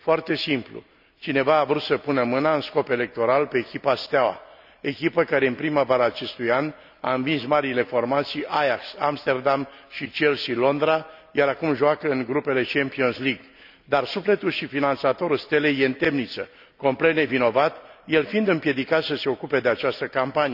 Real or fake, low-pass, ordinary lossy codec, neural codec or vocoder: real; 5.4 kHz; none; none